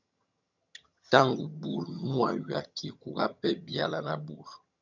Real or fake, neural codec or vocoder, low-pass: fake; vocoder, 22.05 kHz, 80 mel bands, HiFi-GAN; 7.2 kHz